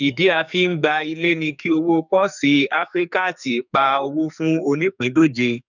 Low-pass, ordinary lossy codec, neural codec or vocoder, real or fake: 7.2 kHz; none; codec, 44.1 kHz, 2.6 kbps, SNAC; fake